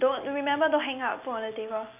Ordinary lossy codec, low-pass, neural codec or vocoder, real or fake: none; 3.6 kHz; none; real